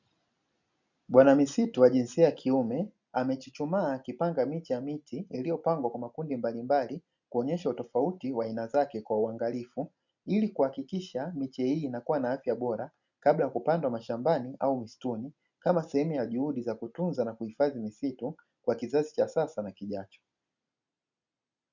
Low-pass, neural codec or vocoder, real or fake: 7.2 kHz; none; real